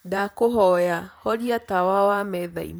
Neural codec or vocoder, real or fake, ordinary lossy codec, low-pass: vocoder, 44.1 kHz, 128 mel bands, Pupu-Vocoder; fake; none; none